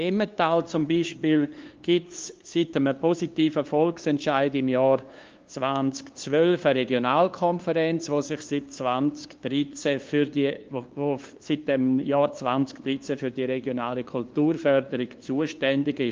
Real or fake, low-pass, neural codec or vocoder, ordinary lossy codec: fake; 7.2 kHz; codec, 16 kHz, 2 kbps, FunCodec, trained on LibriTTS, 25 frames a second; Opus, 32 kbps